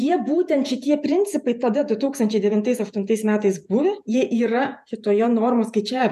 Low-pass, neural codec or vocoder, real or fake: 14.4 kHz; vocoder, 48 kHz, 128 mel bands, Vocos; fake